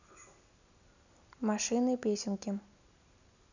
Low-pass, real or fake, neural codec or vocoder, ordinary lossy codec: 7.2 kHz; real; none; none